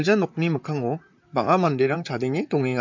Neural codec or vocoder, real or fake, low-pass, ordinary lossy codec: vocoder, 44.1 kHz, 128 mel bands, Pupu-Vocoder; fake; 7.2 kHz; MP3, 48 kbps